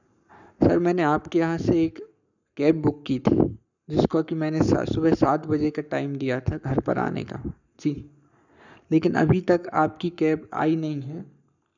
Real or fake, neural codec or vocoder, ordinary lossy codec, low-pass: fake; codec, 44.1 kHz, 7.8 kbps, Pupu-Codec; none; 7.2 kHz